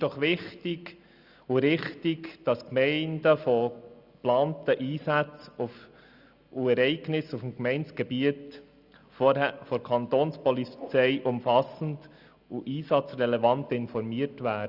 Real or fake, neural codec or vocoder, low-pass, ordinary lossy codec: real; none; 5.4 kHz; Opus, 64 kbps